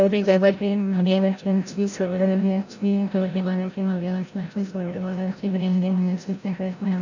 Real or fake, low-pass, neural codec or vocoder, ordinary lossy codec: fake; 7.2 kHz; codec, 16 kHz, 0.5 kbps, FreqCodec, larger model; none